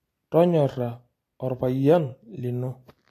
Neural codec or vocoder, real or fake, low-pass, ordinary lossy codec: none; real; 14.4 kHz; AAC, 48 kbps